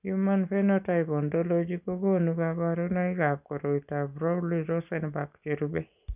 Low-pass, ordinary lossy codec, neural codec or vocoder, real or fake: 3.6 kHz; none; none; real